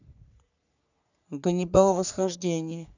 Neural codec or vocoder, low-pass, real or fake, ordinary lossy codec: codec, 44.1 kHz, 3.4 kbps, Pupu-Codec; 7.2 kHz; fake; none